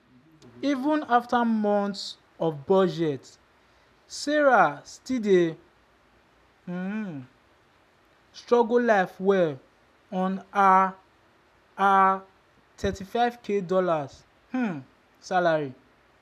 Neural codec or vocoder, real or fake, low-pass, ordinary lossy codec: none; real; 14.4 kHz; none